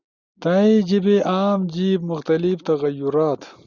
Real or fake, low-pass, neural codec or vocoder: real; 7.2 kHz; none